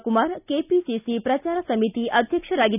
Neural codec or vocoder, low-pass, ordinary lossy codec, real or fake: none; 3.6 kHz; none; real